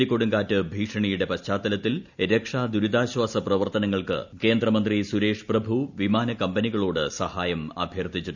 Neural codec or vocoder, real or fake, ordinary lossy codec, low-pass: none; real; none; 7.2 kHz